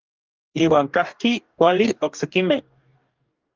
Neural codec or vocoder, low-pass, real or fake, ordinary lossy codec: codec, 44.1 kHz, 2.6 kbps, DAC; 7.2 kHz; fake; Opus, 24 kbps